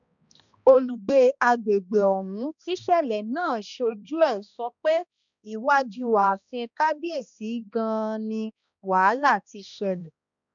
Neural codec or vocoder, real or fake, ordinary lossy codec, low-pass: codec, 16 kHz, 1 kbps, X-Codec, HuBERT features, trained on balanced general audio; fake; none; 7.2 kHz